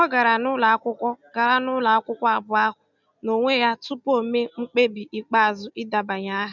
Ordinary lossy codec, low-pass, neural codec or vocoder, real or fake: none; 7.2 kHz; none; real